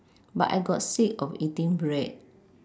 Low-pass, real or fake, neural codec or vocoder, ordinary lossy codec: none; real; none; none